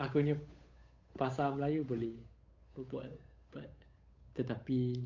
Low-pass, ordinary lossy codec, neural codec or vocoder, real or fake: 7.2 kHz; MP3, 64 kbps; codec, 16 kHz, 8 kbps, FunCodec, trained on Chinese and English, 25 frames a second; fake